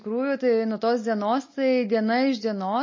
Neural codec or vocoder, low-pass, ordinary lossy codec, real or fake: none; 7.2 kHz; MP3, 32 kbps; real